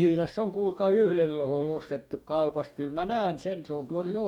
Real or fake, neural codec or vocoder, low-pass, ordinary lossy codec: fake; codec, 44.1 kHz, 2.6 kbps, DAC; 19.8 kHz; none